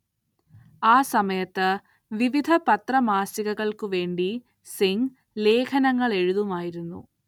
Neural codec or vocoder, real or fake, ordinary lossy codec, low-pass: none; real; none; 19.8 kHz